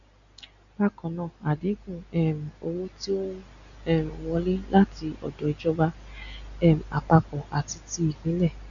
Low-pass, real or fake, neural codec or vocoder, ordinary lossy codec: 7.2 kHz; real; none; none